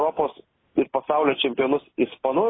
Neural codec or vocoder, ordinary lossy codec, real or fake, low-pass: none; AAC, 16 kbps; real; 7.2 kHz